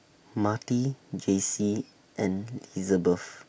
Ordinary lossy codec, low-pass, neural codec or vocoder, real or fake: none; none; none; real